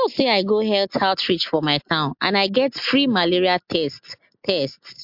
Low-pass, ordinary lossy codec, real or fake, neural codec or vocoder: 5.4 kHz; MP3, 48 kbps; real; none